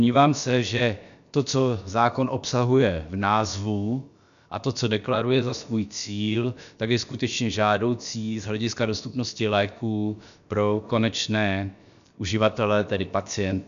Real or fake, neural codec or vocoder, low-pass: fake; codec, 16 kHz, about 1 kbps, DyCAST, with the encoder's durations; 7.2 kHz